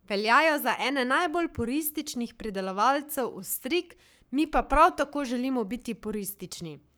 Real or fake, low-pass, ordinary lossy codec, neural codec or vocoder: fake; none; none; codec, 44.1 kHz, 7.8 kbps, Pupu-Codec